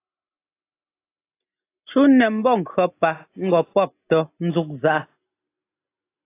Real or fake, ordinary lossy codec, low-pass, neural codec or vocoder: real; AAC, 24 kbps; 3.6 kHz; none